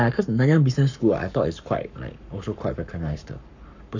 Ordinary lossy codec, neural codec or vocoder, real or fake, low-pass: none; codec, 44.1 kHz, 7.8 kbps, Pupu-Codec; fake; 7.2 kHz